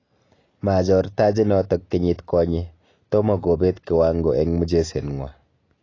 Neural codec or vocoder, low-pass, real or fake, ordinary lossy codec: none; 7.2 kHz; real; AAC, 32 kbps